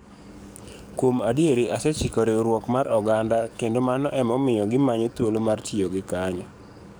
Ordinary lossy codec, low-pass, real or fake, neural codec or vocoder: none; none; fake; codec, 44.1 kHz, 7.8 kbps, DAC